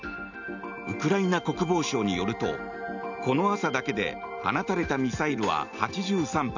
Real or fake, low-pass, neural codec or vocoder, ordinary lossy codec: real; 7.2 kHz; none; none